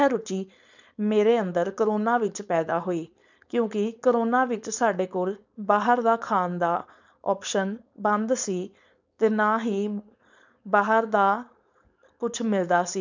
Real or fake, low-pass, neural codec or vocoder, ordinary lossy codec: fake; 7.2 kHz; codec, 16 kHz, 4.8 kbps, FACodec; none